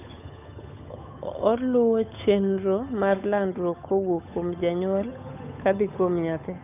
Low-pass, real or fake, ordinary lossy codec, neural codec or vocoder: 3.6 kHz; fake; none; codec, 16 kHz, 8 kbps, FunCodec, trained on Chinese and English, 25 frames a second